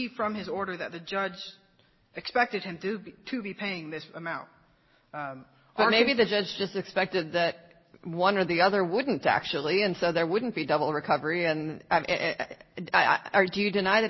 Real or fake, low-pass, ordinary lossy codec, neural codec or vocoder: real; 7.2 kHz; MP3, 24 kbps; none